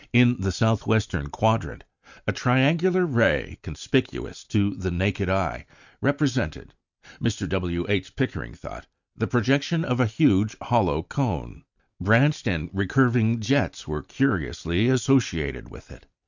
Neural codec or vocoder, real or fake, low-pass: none; real; 7.2 kHz